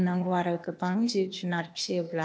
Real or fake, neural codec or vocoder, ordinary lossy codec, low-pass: fake; codec, 16 kHz, 0.8 kbps, ZipCodec; none; none